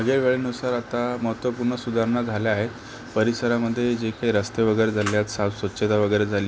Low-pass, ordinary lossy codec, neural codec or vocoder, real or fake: none; none; none; real